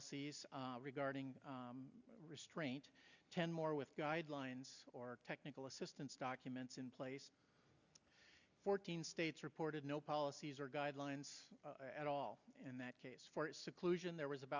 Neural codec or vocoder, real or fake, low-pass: none; real; 7.2 kHz